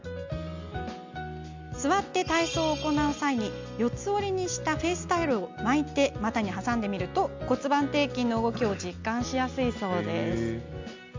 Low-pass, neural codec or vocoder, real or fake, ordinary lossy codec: 7.2 kHz; none; real; MP3, 64 kbps